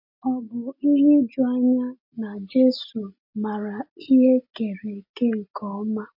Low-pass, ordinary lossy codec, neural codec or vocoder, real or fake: 5.4 kHz; MP3, 32 kbps; none; real